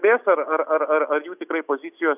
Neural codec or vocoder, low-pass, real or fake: none; 3.6 kHz; real